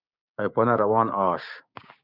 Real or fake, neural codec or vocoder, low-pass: fake; codec, 16 kHz, 6 kbps, DAC; 5.4 kHz